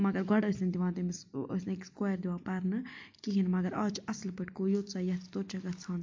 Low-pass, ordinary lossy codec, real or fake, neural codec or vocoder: 7.2 kHz; MP3, 48 kbps; real; none